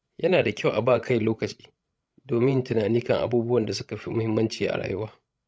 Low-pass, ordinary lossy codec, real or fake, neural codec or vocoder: none; none; fake; codec, 16 kHz, 16 kbps, FreqCodec, larger model